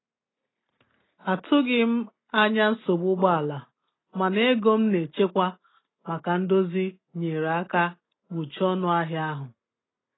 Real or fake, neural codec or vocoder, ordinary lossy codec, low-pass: real; none; AAC, 16 kbps; 7.2 kHz